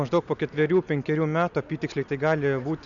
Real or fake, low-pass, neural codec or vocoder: real; 7.2 kHz; none